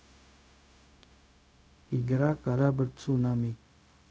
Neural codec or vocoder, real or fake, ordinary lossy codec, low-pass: codec, 16 kHz, 0.4 kbps, LongCat-Audio-Codec; fake; none; none